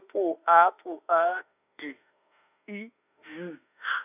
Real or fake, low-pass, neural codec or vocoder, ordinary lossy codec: fake; 3.6 kHz; autoencoder, 48 kHz, 32 numbers a frame, DAC-VAE, trained on Japanese speech; none